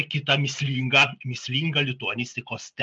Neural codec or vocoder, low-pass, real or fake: none; 9.9 kHz; real